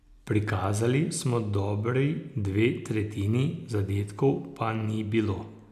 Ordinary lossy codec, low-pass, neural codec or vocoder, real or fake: none; 14.4 kHz; none; real